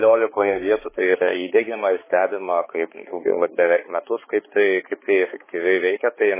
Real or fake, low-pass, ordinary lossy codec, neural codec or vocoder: fake; 3.6 kHz; MP3, 16 kbps; codec, 16 kHz, 4 kbps, X-Codec, HuBERT features, trained on balanced general audio